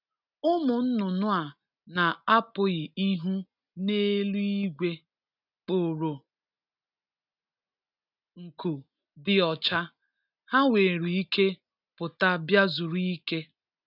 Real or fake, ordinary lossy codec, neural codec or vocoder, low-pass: real; none; none; 5.4 kHz